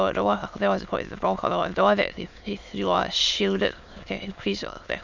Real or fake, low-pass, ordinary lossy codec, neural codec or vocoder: fake; 7.2 kHz; none; autoencoder, 22.05 kHz, a latent of 192 numbers a frame, VITS, trained on many speakers